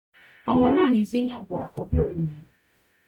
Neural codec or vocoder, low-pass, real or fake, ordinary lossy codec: codec, 44.1 kHz, 0.9 kbps, DAC; 19.8 kHz; fake; none